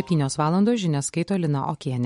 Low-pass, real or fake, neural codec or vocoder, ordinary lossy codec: 19.8 kHz; real; none; MP3, 48 kbps